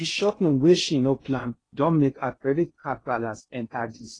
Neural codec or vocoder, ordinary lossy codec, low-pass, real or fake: codec, 16 kHz in and 24 kHz out, 0.6 kbps, FocalCodec, streaming, 2048 codes; AAC, 32 kbps; 9.9 kHz; fake